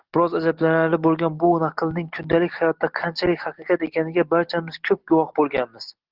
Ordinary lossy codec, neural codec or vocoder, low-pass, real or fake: Opus, 16 kbps; none; 5.4 kHz; real